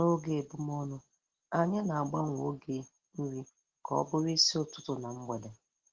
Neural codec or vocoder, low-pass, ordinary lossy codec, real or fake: none; 7.2 kHz; Opus, 16 kbps; real